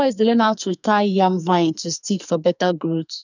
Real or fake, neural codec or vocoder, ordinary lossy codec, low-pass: fake; codec, 44.1 kHz, 2.6 kbps, SNAC; none; 7.2 kHz